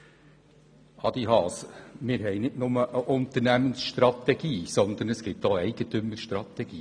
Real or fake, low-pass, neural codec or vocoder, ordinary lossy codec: real; 9.9 kHz; none; none